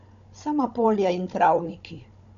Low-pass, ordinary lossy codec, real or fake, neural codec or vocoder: 7.2 kHz; none; fake; codec, 16 kHz, 16 kbps, FunCodec, trained on Chinese and English, 50 frames a second